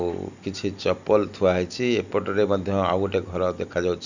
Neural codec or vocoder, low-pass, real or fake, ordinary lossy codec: none; 7.2 kHz; real; none